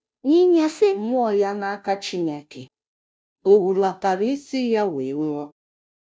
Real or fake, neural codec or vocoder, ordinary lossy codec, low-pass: fake; codec, 16 kHz, 0.5 kbps, FunCodec, trained on Chinese and English, 25 frames a second; none; none